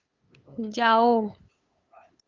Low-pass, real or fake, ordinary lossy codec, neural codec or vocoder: 7.2 kHz; fake; Opus, 32 kbps; codec, 16 kHz, 8 kbps, FunCodec, trained on LibriTTS, 25 frames a second